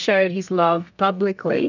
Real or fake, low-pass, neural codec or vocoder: fake; 7.2 kHz; codec, 32 kHz, 1.9 kbps, SNAC